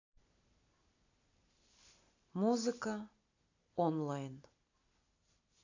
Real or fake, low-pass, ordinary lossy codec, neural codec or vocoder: real; 7.2 kHz; AAC, 48 kbps; none